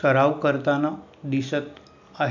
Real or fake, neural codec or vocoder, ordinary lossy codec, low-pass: real; none; none; 7.2 kHz